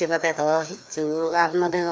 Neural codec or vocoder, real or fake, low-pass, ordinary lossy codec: codec, 16 kHz, 2 kbps, FreqCodec, larger model; fake; none; none